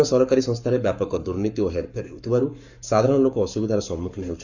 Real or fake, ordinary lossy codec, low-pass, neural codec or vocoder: fake; none; 7.2 kHz; codec, 44.1 kHz, 7.8 kbps, Pupu-Codec